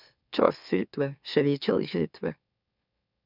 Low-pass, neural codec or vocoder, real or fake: 5.4 kHz; autoencoder, 44.1 kHz, a latent of 192 numbers a frame, MeloTTS; fake